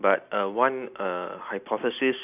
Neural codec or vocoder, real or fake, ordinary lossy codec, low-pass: none; real; none; 3.6 kHz